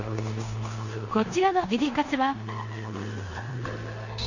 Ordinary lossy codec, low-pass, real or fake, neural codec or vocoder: none; 7.2 kHz; fake; codec, 16 kHz in and 24 kHz out, 0.9 kbps, LongCat-Audio-Codec, four codebook decoder